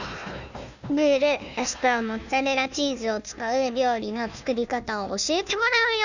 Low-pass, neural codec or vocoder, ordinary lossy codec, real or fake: 7.2 kHz; codec, 16 kHz, 1 kbps, FunCodec, trained on Chinese and English, 50 frames a second; none; fake